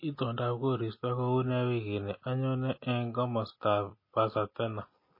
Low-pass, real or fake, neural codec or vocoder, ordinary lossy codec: 5.4 kHz; real; none; MP3, 24 kbps